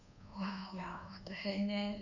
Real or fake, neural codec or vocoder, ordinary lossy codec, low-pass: fake; codec, 24 kHz, 1.2 kbps, DualCodec; none; 7.2 kHz